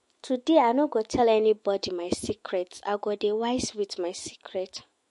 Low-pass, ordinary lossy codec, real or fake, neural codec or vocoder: 14.4 kHz; MP3, 48 kbps; fake; autoencoder, 48 kHz, 128 numbers a frame, DAC-VAE, trained on Japanese speech